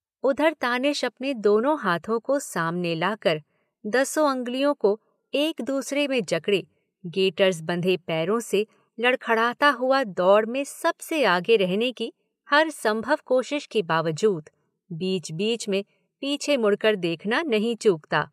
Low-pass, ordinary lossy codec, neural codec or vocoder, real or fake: 14.4 kHz; MP3, 96 kbps; none; real